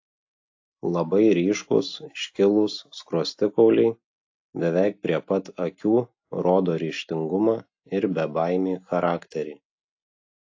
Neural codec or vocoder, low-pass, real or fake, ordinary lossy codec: none; 7.2 kHz; real; AAC, 48 kbps